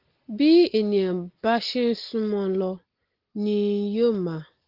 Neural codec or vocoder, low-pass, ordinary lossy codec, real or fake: none; 5.4 kHz; Opus, 16 kbps; real